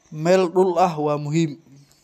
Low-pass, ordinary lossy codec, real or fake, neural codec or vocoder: 14.4 kHz; none; real; none